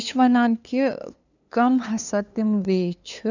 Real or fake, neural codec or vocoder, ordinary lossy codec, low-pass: fake; codec, 16 kHz, 2 kbps, FunCodec, trained on LibriTTS, 25 frames a second; none; 7.2 kHz